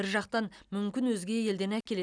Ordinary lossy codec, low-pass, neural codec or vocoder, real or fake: none; 9.9 kHz; none; real